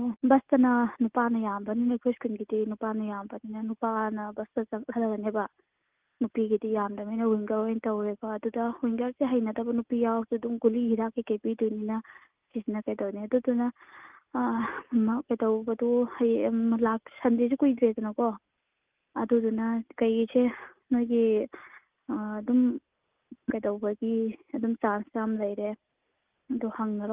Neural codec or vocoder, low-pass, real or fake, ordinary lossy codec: none; 3.6 kHz; real; Opus, 32 kbps